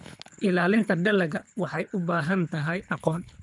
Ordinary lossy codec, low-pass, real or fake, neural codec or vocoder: none; 10.8 kHz; fake; codec, 24 kHz, 3 kbps, HILCodec